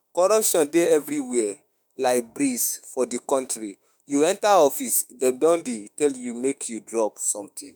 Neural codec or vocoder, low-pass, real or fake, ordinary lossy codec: autoencoder, 48 kHz, 32 numbers a frame, DAC-VAE, trained on Japanese speech; none; fake; none